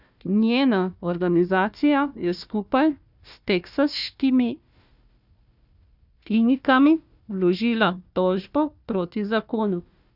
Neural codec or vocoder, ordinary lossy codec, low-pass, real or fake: codec, 16 kHz, 1 kbps, FunCodec, trained on Chinese and English, 50 frames a second; none; 5.4 kHz; fake